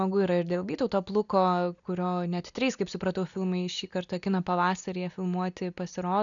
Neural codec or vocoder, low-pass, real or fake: none; 7.2 kHz; real